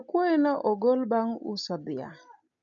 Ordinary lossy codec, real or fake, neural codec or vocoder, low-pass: none; real; none; 7.2 kHz